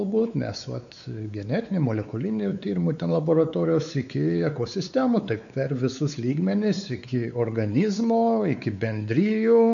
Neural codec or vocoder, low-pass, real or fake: codec, 16 kHz, 4 kbps, X-Codec, WavLM features, trained on Multilingual LibriSpeech; 7.2 kHz; fake